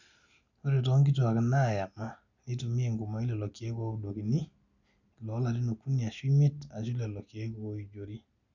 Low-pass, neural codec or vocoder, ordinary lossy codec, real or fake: 7.2 kHz; none; none; real